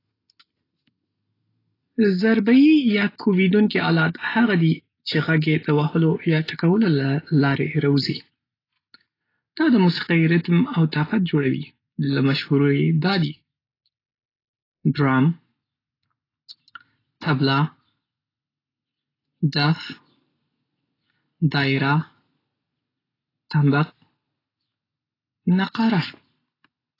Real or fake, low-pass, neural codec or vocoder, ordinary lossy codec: real; 5.4 kHz; none; AAC, 24 kbps